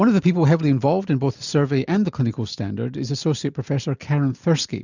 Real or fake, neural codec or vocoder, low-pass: real; none; 7.2 kHz